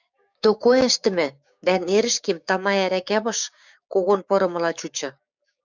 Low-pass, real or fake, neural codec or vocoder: 7.2 kHz; fake; codec, 16 kHz, 6 kbps, DAC